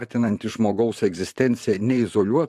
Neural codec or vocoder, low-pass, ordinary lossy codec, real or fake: vocoder, 44.1 kHz, 128 mel bands, Pupu-Vocoder; 14.4 kHz; AAC, 96 kbps; fake